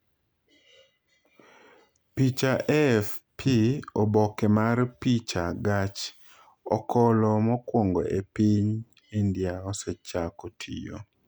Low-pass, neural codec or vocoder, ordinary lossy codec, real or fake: none; none; none; real